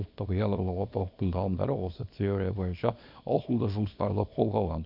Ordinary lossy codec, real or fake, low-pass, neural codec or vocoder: none; fake; 5.4 kHz; codec, 24 kHz, 0.9 kbps, WavTokenizer, small release